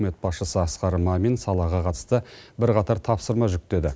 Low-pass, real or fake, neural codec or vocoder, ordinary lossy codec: none; real; none; none